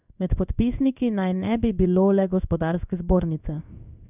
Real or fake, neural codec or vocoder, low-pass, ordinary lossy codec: fake; codec, 16 kHz in and 24 kHz out, 1 kbps, XY-Tokenizer; 3.6 kHz; none